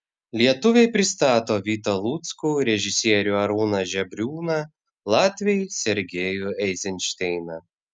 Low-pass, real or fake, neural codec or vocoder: 9.9 kHz; real; none